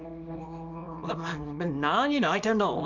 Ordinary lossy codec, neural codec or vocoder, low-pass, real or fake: none; codec, 24 kHz, 0.9 kbps, WavTokenizer, small release; 7.2 kHz; fake